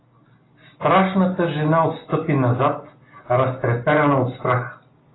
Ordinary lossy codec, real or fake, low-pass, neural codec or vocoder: AAC, 16 kbps; real; 7.2 kHz; none